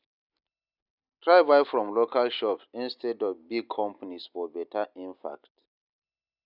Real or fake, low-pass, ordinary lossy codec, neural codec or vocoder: real; 5.4 kHz; none; none